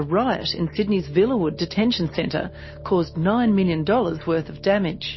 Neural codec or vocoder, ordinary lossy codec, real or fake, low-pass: none; MP3, 24 kbps; real; 7.2 kHz